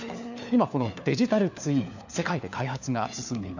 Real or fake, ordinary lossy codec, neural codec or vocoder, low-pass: fake; none; codec, 16 kHz, 4 kbps, FunCodec, trained on LibriTTS, 50 frames a second; 7.2 kHz